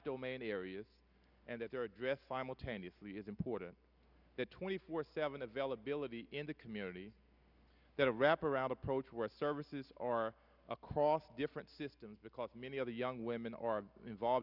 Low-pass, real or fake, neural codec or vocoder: 5.4 kHz; real; none